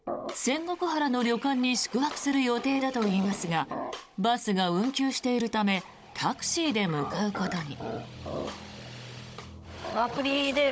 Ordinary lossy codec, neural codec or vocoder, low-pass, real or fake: none; codec, 16 kHz, 16 kbps, FunCodec, trained on Chinese and English, 50 frames a second; none; fake